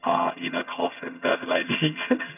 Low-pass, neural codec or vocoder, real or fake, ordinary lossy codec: 3.6 kHz; vocoder, 22.05 kHz, 80 mel bands, HiFi-GAN; fake; none